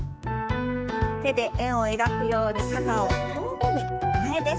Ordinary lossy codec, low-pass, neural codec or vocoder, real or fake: none; none; codec, 16 kHz, 4 kbps, X-Codec, HuBERT features, trained on general audio; fake